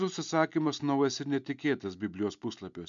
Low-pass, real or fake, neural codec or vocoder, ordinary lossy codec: 7.2 kHz; real; none; MP3, 64 kbps